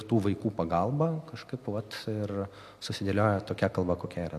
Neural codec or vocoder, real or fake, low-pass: none; real; 14.4 kHz